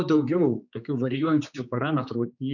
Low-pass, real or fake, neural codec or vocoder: 7.2 kHz; fake; codec, 16 kHz, 4 kbps, X-Codec, HuBERT features, trained on general audio